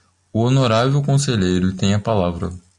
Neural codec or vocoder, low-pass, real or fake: none; 10.8 kHz; real